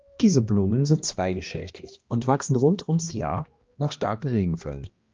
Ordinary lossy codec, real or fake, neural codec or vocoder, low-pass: Opus, 24 kbps; fake; codec, 16 kHz, 1 kbps, X-Codec, HuBERT features, trained on balanced general audio; 7.2 kHz